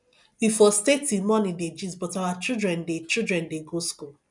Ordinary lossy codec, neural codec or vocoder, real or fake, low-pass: none; none; real; 10.8 kHz